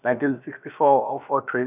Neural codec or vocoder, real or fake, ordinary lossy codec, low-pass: codec, 16 kHz, about 1 kbps, DyCAST, with the encoder's durations; fake; none; 3.6 kHz